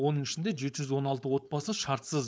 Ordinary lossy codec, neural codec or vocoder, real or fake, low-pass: none; codec, 16 kHz, 4.8 kbps, FACodec; fake; none